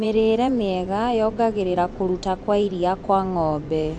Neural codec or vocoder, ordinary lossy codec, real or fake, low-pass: vocoder, 24 kHz, 100 mel bands, Vocos; AAC, 64 kbps; fake; 10.8 kHz